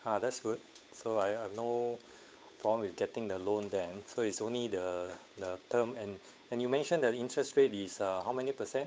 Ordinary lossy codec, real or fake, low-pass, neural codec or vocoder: none; fake; none; codec, 16 kHz, 8 kbps, FunCodec, trained on Chinese and English, 25 frames a second